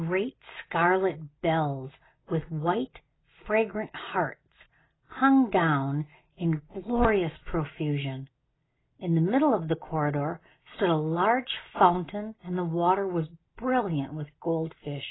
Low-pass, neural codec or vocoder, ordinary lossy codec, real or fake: 7.2 kHz; none; AAC, 16 kbps; real